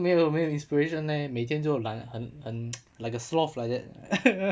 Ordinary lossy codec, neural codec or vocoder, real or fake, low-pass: none; none; real; none